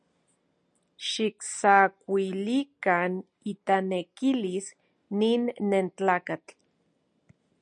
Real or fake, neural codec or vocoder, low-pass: real; none; 9.9 kHz